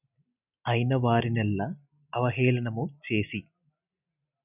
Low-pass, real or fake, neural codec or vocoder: 3.6 kHz; real; none